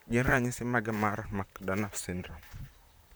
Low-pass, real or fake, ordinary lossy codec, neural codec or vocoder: none; fake; none; vocoder, 44.1 kHz, 128 mel bands, Pupu-Vocoder